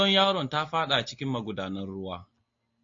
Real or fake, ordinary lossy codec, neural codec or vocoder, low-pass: real; AAC, 48 kbps; none; 7.2 kHz